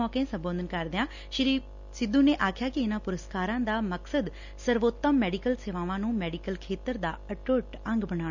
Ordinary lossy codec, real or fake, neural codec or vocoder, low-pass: none; real; none; 7.2 kHz